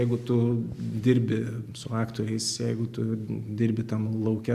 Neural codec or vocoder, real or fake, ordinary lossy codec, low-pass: none; real; Opus, 64 kbps; 14.4 kHz